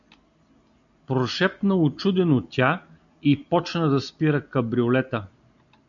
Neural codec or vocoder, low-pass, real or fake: none; 7.2 kHz; real